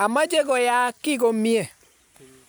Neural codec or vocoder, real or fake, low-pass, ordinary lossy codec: none; real; none; none